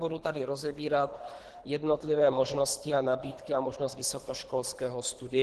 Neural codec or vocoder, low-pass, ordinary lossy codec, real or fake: codec, 24 kHz, 3 kbps, HILCodec; 10.8 kHz; Opus, 16 kbps; fake